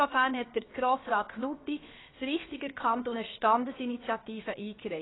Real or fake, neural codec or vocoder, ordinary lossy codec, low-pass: fake; codec, 16 kHz, about 1 kbps, DyCAST, with the encoder's durations; AAC, 16 kbps; 7.2 kHz